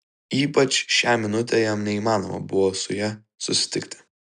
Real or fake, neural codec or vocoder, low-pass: real; none; 10.8 kHz